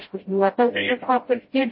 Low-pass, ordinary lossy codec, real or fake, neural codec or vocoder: 7.2 kHz; MP3, 24 kbps; fake; codec, 16 kHz, 0.5 kbps, FreqCodec, smaller model